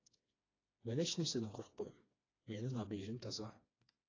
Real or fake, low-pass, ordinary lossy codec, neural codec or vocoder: fake; 7.2 kHz; AAC, 32 kbps; codec, 16 kHz, 2 kbps, FreqCodec, smaller model